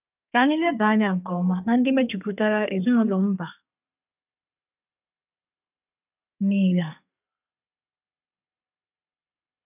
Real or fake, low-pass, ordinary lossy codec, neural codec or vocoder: fake; 3.6 kHz; none; codec, 32 kHz, 1.9 kbps, SNAC